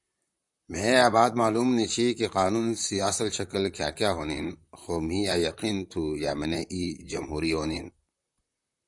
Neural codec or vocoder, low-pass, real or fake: vocoder, 44.1 kHz, 128 mel bands, Pupu-Vocoder; 10.8 kHz; fake